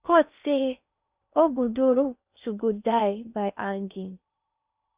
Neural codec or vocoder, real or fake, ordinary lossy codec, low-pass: codec, 16 kHz in and 24 kHz out, 0.8 kbps, FocalCodec, streaming, 65536 codes; fake; Opus, 64 kbps; 3.6 kHz